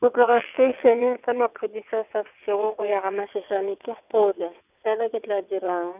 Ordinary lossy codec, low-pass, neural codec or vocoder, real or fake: none; 3.6 kHz; vocoder, 22.05 kHz, 80 mel bands, WaveNeXt; fake